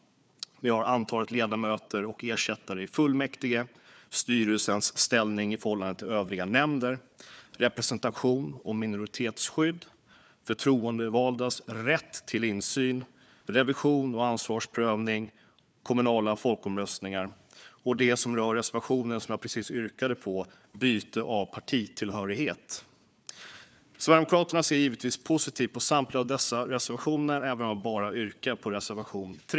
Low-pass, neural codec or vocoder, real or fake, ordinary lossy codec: none; codec, 16 kHz, 4 kbps, FunCodec, trained on Chinese and English, 50 frames a second; fake; none